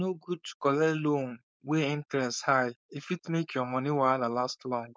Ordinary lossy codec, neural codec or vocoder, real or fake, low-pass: none; codec, 16 kHz, 4.8 kbps, FACodec; fake; none